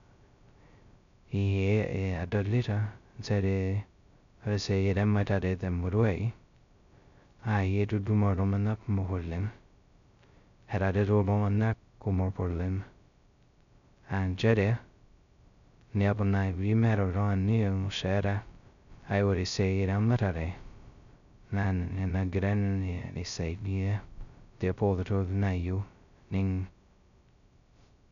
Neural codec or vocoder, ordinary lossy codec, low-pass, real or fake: codec, 16 kHz, 0.2 kbps, FocalCodec; none; 7.2 kHz; fake